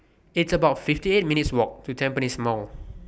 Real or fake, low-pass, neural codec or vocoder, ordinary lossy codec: real; none; none; none